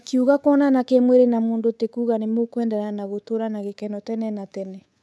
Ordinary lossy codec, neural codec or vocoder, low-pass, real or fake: none; codec, 24 kHz, 3.1 kbps, DualCodec; none; fake